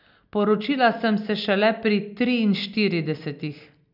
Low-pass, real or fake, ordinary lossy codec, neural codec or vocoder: 5.4 kHz; real; none; none